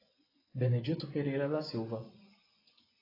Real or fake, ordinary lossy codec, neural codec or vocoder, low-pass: fake; AAC, 24 kbps; vocoder, 24 kHz, 100 mel bands, Vocos; 5.4 kHz